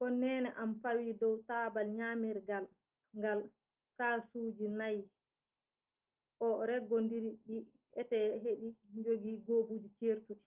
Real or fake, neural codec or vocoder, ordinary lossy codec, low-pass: real; none; Opus, 24 kbps; 3.6 kHz